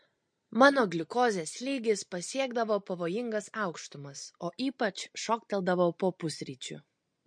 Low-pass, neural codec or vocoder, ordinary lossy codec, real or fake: 9.9 kHz; none; MP3, 48 kbps; real